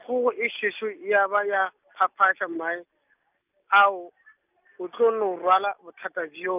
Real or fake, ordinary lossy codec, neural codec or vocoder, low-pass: real; none; none; 3.6 kHz